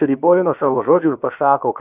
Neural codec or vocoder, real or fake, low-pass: codec, 16 kHz, about 1 kbps, DyCAST, with the encoder's durations; fake; 3.6 kHz